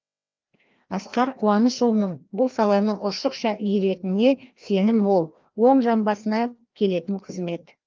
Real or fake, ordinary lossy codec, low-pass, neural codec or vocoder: fake; Opus, 32 kbps; 7.2 kHz; codec, 16 kHz, 1 kbps, FreqCodec, larger model